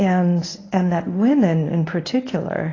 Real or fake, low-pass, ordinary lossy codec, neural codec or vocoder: fake; 7.2 kHz; AAC, 32 kbps; codec, 24 kHz, 0.9 kbps, WavTokenizer, medium speech release version 1